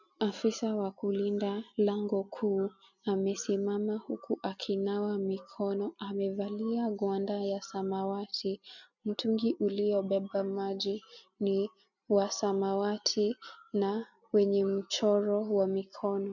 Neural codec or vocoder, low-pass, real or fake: none; 7.2 kHz; real